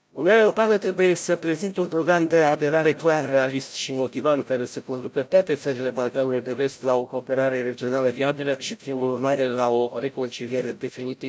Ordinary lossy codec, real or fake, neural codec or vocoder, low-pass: none; fake; codec, 16 kHz, 0.5 kbps, FreqCodec, larger model; none